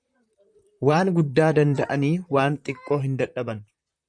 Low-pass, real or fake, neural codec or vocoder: 9.9 kHz; fake; vocoder, 44.1 kHz, 128 mel bands, Pupu-Vocoder